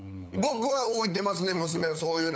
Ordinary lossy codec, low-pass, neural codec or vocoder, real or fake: none; none; codec, 16 kHz, 8 kbps, FunCodec, trained on LibriTTS, 25 frames a second; fake